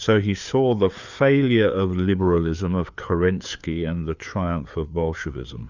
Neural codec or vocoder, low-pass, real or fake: codec, 16 kHz, 4 kbps, FreqCodec, larger model; 7.2 kHz; fake